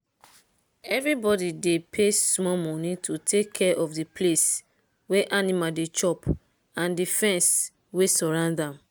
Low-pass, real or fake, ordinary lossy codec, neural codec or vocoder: none; real; none; none